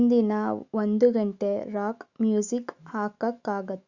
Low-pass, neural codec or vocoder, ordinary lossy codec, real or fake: 7.2 kHz; autoencoder, 48 kHz, 128 numbers a frame, DAC-VAE, trained on Japanese speech; Opus, 64 kbps; fake